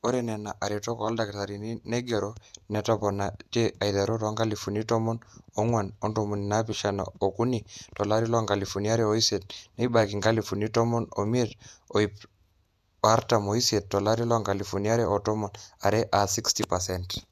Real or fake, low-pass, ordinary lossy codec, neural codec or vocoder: fake; 14.4 kHz; none; vocoder, 48 kHz, 128 mel bands, Vocos